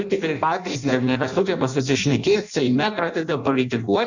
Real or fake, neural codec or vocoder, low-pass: fake; codec, 16 kHz in and 24 kHz out, 0.6 kbps, FireRedTTS-2 codec; 7.2 kHz